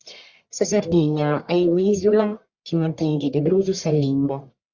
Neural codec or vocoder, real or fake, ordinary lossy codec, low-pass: codec, 44.1 kHz, 1.7 kbps, Pupu-Codec; fake; Opus, 64 kbps; 7.2 kHz